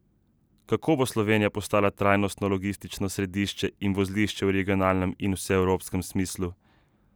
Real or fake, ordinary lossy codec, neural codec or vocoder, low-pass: real; none; none; none